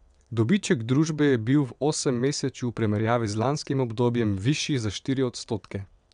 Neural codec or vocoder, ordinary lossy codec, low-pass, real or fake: vocoder, 22.05 kHz, 80 mel bands, WaveNeXt; none; 9.9 kHz; fake